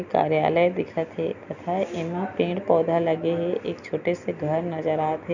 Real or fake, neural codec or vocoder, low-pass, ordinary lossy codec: real; none; 7.2 kHz; none